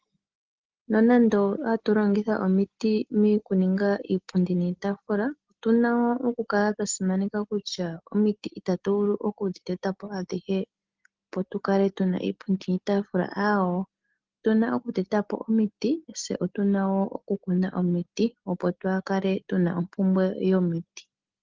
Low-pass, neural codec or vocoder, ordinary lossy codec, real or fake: 7.2 kHz; none; Opus, 16 kbps; real